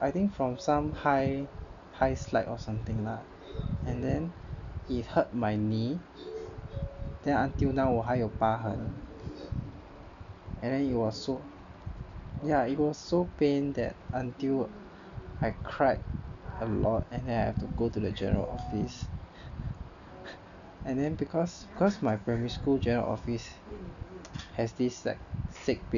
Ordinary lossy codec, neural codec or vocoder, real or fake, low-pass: none; none; real; 7.2 kHz